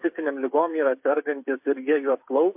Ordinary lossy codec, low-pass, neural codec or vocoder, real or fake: MP3, 32 kbps; 3.6 kHz; codec, 16 kHz, 8 kbps, FreqCodec, smaller model; fake